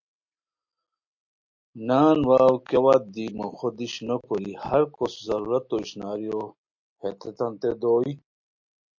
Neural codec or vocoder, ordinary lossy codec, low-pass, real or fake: none; MP3, 48 kbps; 7.2 kHz; real